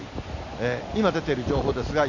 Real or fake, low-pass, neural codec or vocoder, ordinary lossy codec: real; 7.2 kHz; none; none